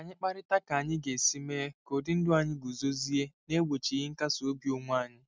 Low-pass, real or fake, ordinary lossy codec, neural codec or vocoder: none; real; none; none